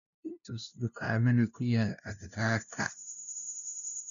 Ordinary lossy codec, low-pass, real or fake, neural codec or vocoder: none; 7.2 kHz; fake; codec, 16 kHz, 0.5 kbps, FunCodec, trained on LibriTTS, 25 frames a second